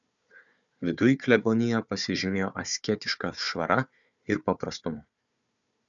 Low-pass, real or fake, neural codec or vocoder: 7.2 kHz; fake; codec, 16 kHz, 4 kbps, FunCodec, trained on Chinese and English, 50 frames a second